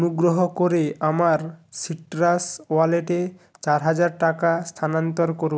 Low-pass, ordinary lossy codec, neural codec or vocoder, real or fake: none; none; none; real